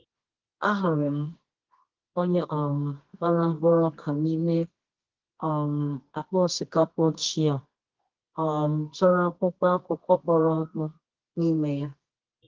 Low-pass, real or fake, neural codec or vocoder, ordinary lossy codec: 7.2 kHz; fake; codec, 24 kHz, 0.9 kbps, WavTokenizer, medium music audio release; Opus, 16 kbps